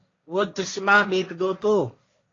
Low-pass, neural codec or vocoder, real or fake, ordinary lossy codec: 7.2 kHz; codec, 16 kHz, 1.1 kbps, Voila-Tokenizer; fake; AAC, 32 kbps